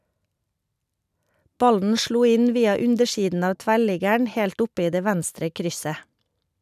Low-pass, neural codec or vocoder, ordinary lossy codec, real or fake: 14.4 kHz; none; none; real